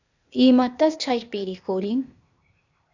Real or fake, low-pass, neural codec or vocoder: fake; 7.2 kHz; codec, 16 kHz, 0.8 kbps, ZipCodec